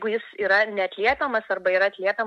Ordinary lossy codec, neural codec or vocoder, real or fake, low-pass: MP3, 64 kbps; none; real; 14.4 kHz